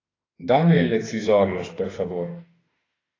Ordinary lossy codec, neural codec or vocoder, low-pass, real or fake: AAC, 32 kbps; autoencoder, 48 kHz, 32 numbers a frame, DAC-VAE, trained on Japanese speech; 7.2 kHz; fake